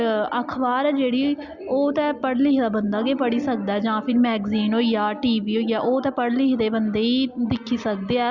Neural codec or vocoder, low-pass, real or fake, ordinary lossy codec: none; 7.2 kHz; real; Opus, 64 kbps